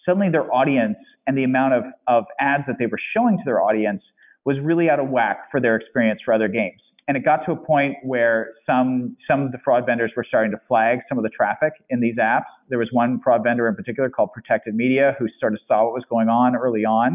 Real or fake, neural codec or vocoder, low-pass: real; none; 3.6 kHz